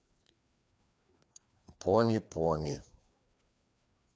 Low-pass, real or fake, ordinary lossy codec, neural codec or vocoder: none; fake; none; codec, 16 kHz, 2 kbps, FreqCodec, larger model